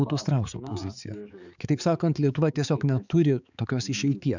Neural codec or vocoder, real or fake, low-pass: codec, 16 kHz, 4 kbps, X-Codec, HuBERT features, trained on balanced general audio; fake; 7.2 kHz